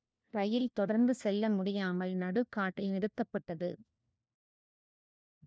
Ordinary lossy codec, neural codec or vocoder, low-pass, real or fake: none; codec, 16 kHz, 1 kbps, FunCodec, trained on LibriTTS, 50 frames a second; none; fake